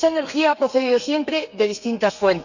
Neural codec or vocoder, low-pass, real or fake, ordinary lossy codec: codec, 32 kHz, 1.9 kbps, SNAC; 7.2 kHz; fake; none